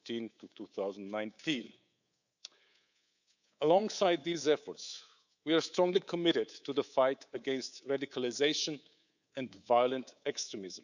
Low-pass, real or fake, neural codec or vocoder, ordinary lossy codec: 7.2 kHz; fake; codec, 24 kHz, 3.1 kbps, DualCodec; none